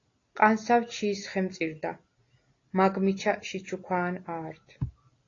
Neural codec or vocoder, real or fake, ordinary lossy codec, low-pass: none; real; AAC, 32 kbps; 7.2 kHz